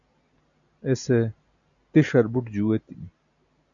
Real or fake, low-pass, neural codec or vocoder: real; 7.2 kHz; none